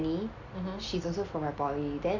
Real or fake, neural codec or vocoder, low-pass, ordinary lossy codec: real; none; 7.2 kHz; none